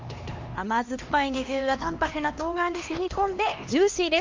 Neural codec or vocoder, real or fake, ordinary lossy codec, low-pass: codec, 16 kHz, 2 kbps, X-Codec, HuBERT features, trained on LibriSpeech; fake; Opus, 32 kbps; 7.2 kHz